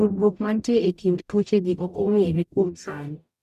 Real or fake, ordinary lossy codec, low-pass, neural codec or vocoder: fake; MP3, 96 kbps; 14.4 kHz; codec, 44.1 kHz, 0.9 kbps, DAC